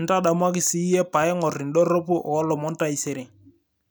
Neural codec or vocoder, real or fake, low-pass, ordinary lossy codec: none; real; none; none